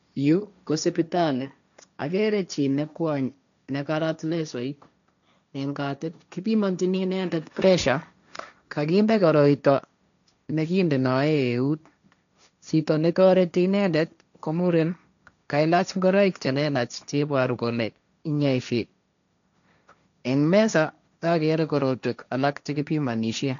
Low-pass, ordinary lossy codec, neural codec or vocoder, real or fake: 7.2 kHz; none; codec, 16 kHz, 1.1 kbps, Voila-Tokenizer; fake